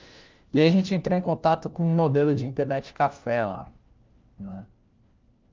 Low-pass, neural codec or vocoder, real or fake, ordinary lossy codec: 7.2 kHz; codec, 16 kHz, 1 kbps, FunCodec, trained on LibriTTS, 50 frames a second; fake; Opus, 24 kbps